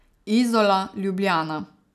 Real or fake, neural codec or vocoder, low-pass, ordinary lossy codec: real; none; 14.4 kHz; none